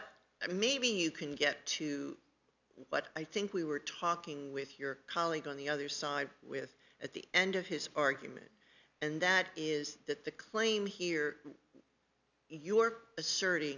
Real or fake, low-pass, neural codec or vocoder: real; 7.2 kHz; none